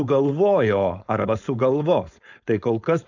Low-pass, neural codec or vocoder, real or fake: 7.2 kHz; codec, 16 kHz, 4.8 kbps, FACodec; fake